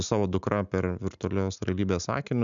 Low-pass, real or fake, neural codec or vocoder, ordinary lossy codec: 7.2 kHz; real; none; MP3, 96 kbps